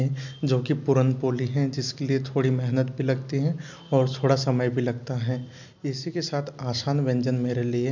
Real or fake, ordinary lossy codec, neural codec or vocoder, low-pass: real; none; none; 7.2 kHz